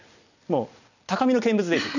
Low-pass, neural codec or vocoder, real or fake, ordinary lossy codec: 7.2 kHz; none; real; none